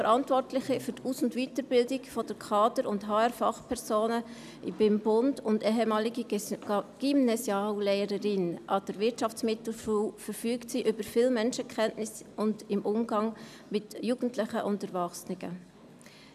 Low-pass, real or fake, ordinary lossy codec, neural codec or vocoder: 14.4 kHz; real; none; none